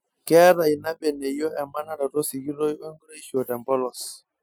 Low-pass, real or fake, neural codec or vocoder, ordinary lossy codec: none; real; none; none